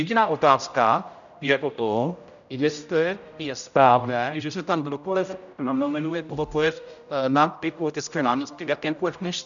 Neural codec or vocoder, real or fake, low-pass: codec, 16 kHz, 0.5 kbps, X-Codec, HuBERT features, trained on general audio; fake; 7.2 kHz